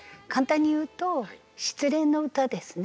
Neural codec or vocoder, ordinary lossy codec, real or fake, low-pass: none; none; real; none